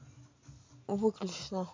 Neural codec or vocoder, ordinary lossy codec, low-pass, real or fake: none; MP3, 48 kbps; 7.2 kHz; real